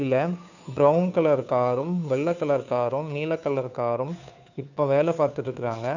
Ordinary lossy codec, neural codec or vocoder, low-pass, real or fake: none; codec, 16 kHz, 2 kbps, FunCodec, trained on Chinese and English, 25 frames a second; 7.2 kHz; fake